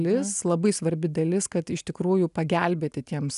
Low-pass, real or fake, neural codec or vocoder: 10.8 kHz; real; none